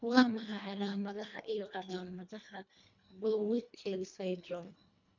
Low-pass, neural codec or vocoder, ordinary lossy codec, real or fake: 7.2 kHz; codec, 24 kHz, 1.5 kbps, HILCodec; MP3, 64 kbps; fake